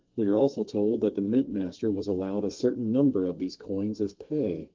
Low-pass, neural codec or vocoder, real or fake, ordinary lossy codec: 7.2 kHz; codec, 32 kHz, 1.9 kbps, SNAC; fake; Opus, 32 kbps